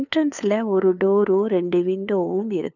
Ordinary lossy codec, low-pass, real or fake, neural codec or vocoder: none; 7.2 kHz; fake; codec, 16 kHz, 2 kbps, FunCodec, trained on LibriTTS, 25 frames a second